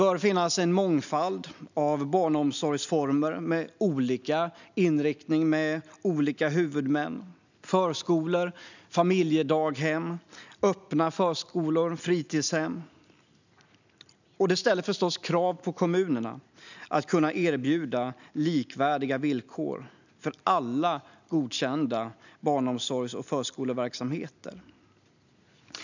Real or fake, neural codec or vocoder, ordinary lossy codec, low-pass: real; none; none; 7.2 kHz